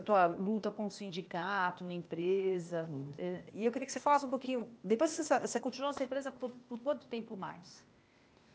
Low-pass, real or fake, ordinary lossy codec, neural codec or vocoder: none; fake; none; codec, 16 kHz, 0.8 kbps, ZipCodec